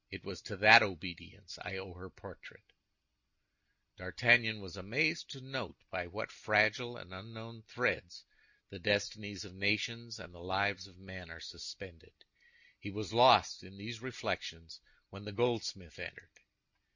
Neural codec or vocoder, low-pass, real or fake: none; 7.2 kHz; real